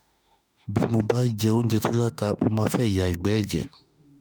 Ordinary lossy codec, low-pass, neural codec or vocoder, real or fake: none; none; autoencoder, 48 kHz, 32 numbers a frame, DAC-VAE, trained on Japanese speech; fake